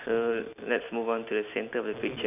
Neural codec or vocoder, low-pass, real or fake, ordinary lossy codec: none; 3.6 kHz; real; none